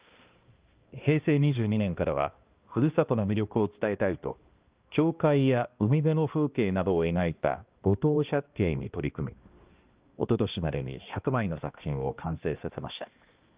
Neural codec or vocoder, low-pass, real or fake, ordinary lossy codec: codec, 16 kHz, 1 kbps, X-Codec, HuBERT features, trained on balanced general audio; 3.6 kHz; fake; Opus, 32 kbps